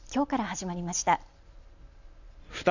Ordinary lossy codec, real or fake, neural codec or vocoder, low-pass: none; real; none; 7.2 kHz